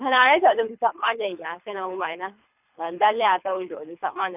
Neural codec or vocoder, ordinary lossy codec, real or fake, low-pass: codec, 24 kHz, 3 kbps, HILCodec; none; fake; 3.6 kHz